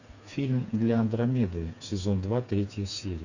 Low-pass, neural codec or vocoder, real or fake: 7.2 kHz; codec, 16 kHz, 4 kbps, FreqCodec, smaller model; fake